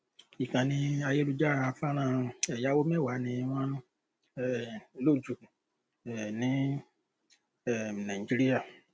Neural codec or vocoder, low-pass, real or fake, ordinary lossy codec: none; none; real; none